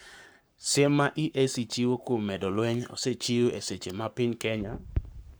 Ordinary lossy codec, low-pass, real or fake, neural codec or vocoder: none; none; fake; codec, 44.1 kHz, 7.8 kbps, Pupu-Codec